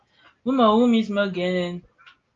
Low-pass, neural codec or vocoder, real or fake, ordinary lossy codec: 7.2 kHz; none; real; Opus, 32 kbps